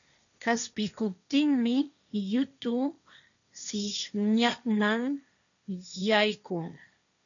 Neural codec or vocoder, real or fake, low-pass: codec, 16 kHz, 1.1 kbps, Voila-Tokenizer; fake; 7.2 kHz